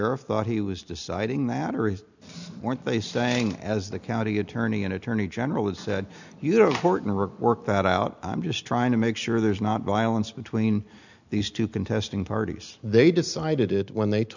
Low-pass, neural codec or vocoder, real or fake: 7.2 kHz; none; real